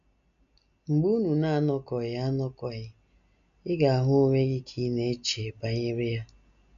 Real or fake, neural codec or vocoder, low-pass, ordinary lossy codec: real; none; 7.2 kHz; none